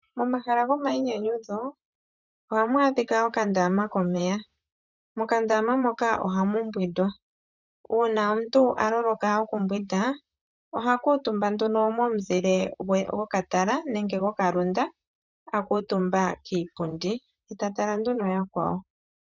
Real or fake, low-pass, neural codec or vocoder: fake; 7.2 kHz; vocoder, 44.1 kHz, 128 mel bands, Pupu-Vocoder